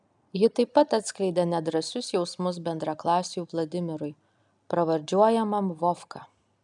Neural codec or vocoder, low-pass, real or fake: none; 10.8 kHz; real